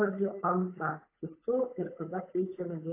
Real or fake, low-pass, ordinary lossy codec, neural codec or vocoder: fake; 3.6 kHz; AAC, 24 kbps; codec, 16 kHz, 8 kbps, FunCodec, trained on Chinese and English, 25 frames a second